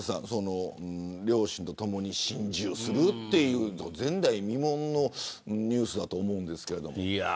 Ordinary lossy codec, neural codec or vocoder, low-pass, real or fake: none; none; none; real